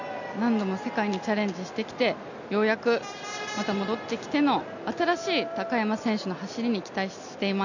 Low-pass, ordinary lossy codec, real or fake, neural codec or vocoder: 7.2 kHz; none; real; none